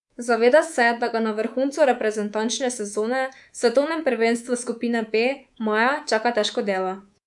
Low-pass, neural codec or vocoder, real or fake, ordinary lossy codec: 10.8 kHz; codec, 24 kHz, 3.1 kbps, DualCodec; fake; none